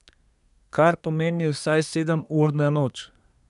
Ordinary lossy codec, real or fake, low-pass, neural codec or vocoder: none; fake; 10.8 kHz; codec, 24 kHz, 1 kbps, SNAC